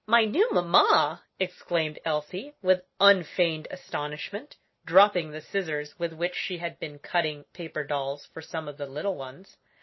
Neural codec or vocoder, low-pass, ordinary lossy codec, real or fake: none; 7.2 kHz; MP3, 24 kbps; real